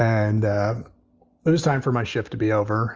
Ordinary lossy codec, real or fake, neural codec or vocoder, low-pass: Opus, 24 kbps; real; none; 7.2 kHz